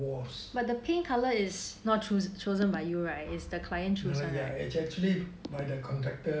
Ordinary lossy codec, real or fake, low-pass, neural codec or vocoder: none; real; none; none